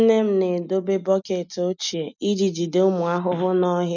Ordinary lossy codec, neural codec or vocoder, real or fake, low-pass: none; none; real; 7.2 kHz